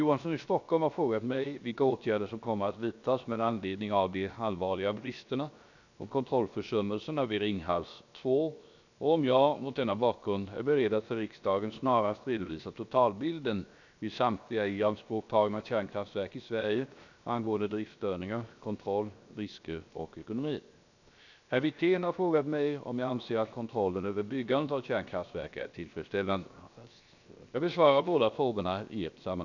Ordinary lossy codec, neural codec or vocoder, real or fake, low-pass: none; codec, 16 kHz, 0.7 kbps, FocalCodec; fake; 7.2 kHz